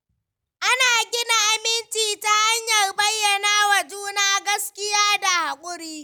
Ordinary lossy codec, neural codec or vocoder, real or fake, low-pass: none; none; real; none